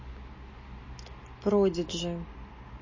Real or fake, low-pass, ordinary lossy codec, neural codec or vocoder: real; 7.2 kHz; MP3, 32 kbps; none